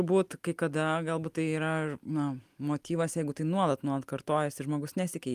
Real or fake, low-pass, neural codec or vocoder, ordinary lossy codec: real; 14.4 kHz; none; Opus, 32 kbps